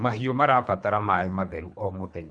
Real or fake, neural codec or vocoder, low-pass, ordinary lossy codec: fake; codec, 24 kHz, 3 kbps, HILCodec; 9.9 kHz; none